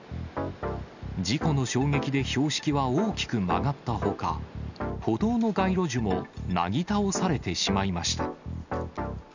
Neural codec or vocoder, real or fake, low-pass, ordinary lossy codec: none; real; 7.2 kHz; none